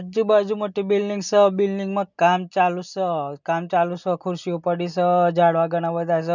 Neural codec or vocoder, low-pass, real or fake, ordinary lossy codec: none; 7.2 kHz; real; none